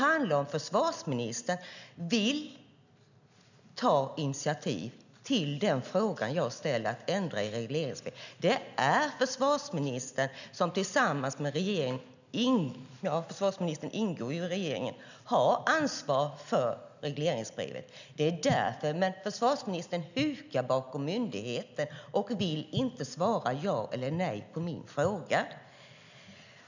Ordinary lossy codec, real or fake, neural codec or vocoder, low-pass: none; real; none; 7.2 kHz